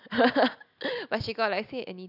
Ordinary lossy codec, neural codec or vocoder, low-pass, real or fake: none; none; 5.4 kHz; real